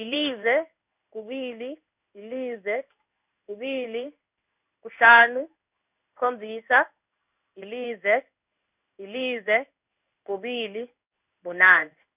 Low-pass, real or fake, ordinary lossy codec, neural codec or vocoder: 3.6 kHz; fake; none; codec, 16 kHz in and 24 kHz out, 1 kbps, XY-Tokenizer